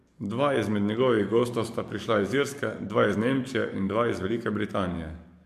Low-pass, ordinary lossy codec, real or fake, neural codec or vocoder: 14.4 kHz; MP3, 96 kbps; fake; codec, 44.1 kHz, 7.8 kbps, DAC